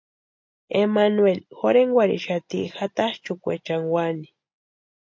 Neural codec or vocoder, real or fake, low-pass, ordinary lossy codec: none; real; 7.2 kHz; MP3, 48 kbps